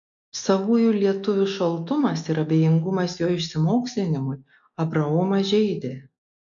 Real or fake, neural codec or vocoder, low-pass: real; none; 7.2 kHz